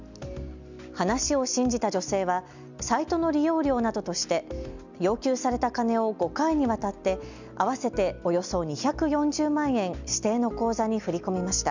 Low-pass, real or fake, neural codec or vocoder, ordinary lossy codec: 7.2 kHz; real; none; none